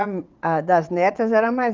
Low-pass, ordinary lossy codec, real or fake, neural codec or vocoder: 7.2 kHz; Opus, 24 kbps; fake; vocoder, 22.05 kHz, 80 mel bands, WaveNeXt